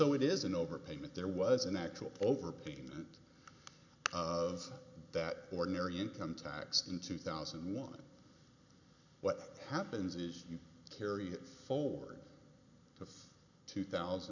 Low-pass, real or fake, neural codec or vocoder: 7.2 kHz; real; none